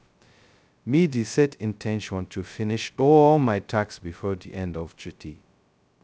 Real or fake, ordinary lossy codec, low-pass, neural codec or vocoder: fake; none; none; codec, 16 kHz, 0.2 kbps, FocalCodec